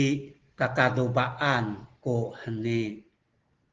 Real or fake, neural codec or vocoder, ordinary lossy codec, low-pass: real; none; Opus, 16 kbps; 7.2 kHz